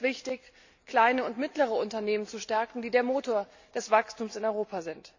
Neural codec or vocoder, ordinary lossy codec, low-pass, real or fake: none; AAC, 48 kbps; 7.2 kHz; real